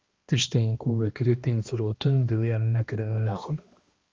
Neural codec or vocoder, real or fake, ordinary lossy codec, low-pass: codec, 16 kHz, 1 kbps, X-Codec, HuBERT features, trained on balanced general audio; fake; Opus, 32 kbps; 7.2 kHz